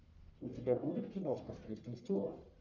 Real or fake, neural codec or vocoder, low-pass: fake; codec, 44.1 kHz, 1.7 kbps, Pupu-Codec; 7.2 kHz